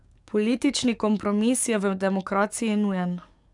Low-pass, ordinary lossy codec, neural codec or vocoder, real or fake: 10.8 kHz; none; codec, 44.1 kHz, 7.8 kbps, DAC; fake